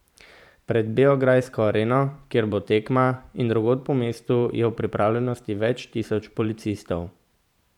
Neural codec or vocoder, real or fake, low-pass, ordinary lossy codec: vocoder, 44.1 kHz, 128 mel bands every 512 samples, BigVGAN v2; fake; 19.8 kHz; none